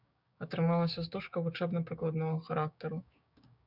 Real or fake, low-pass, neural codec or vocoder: fake; 5.4 kHz; codec, 16 kHz, 6 kbps, DAC